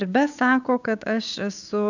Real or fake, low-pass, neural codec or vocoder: fake; 7.2 kHz; codec, 16 kHz, 6 kbps, DAC